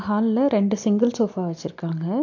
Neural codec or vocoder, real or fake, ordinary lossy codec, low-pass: none; real; MP3, 48 kbps; 7.2 kHz